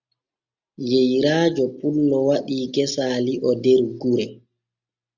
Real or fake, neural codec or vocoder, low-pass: real; none; 7.2 kHz